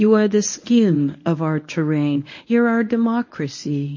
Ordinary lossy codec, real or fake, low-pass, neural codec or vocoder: MP3, 32 kbps; fake; 7.2 kHz; codec, 24 kHz, 0.9 kbps, WavTokenizer, medium speech release version 1